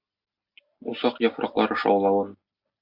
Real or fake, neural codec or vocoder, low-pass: real; none; 5.4 kHz